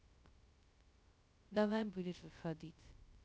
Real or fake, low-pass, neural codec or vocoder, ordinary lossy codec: fake; none; codec, 16 kHz, 0.2 kbps, FocalCodec; none